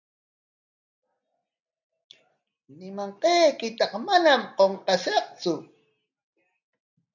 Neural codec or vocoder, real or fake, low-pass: none; real; 7.2 kHz